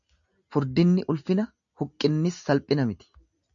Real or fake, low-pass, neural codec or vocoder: real; 7.2 kHz; none